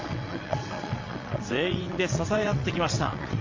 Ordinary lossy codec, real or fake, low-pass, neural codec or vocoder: MP3, 48 kbps; fake; 7.2 kHz; vocoder, 22.05 kHz, 80 mel bands, WaveNeXt